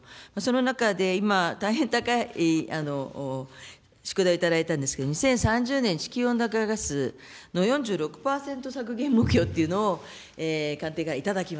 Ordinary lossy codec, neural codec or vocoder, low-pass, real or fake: none; none; none; real